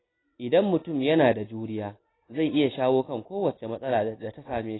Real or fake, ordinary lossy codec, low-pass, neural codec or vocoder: real; AAC, 16 kbps; 7.2 kHz; none